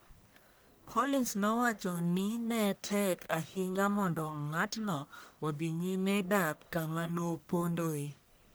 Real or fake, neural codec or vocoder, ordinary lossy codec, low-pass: fake; codec, 44.1 kHz, 1.7 kbps, Pupu-Codec; none; none